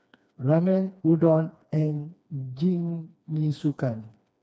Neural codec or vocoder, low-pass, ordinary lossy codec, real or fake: codec, 16 kHz, 2 kbps, FreqCodec, smaller model; none; none; fake